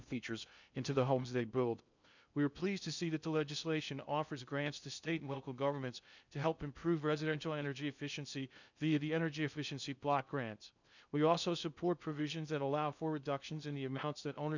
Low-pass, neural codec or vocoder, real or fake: 7.2 kHz; codec, 16 kHz in and 24 kHz out, 0.6 kbps, FocalCodec, streaming, 2048 codes; fake